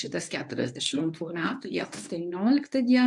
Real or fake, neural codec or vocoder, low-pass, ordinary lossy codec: fake; codec, 24 kHz, 0.9 kbps, WavTokenizer, medium speech release version 1; 10.8 kHz; AAC, 64 kbps